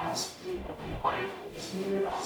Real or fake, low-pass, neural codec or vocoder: fake; 19.8 kHz; codec, 44.1 kHz, 0.9 kbps, DAC